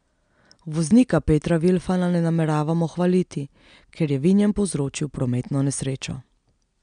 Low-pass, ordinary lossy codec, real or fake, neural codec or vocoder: 9.9 kHz; MP3, 96 kbps; real; none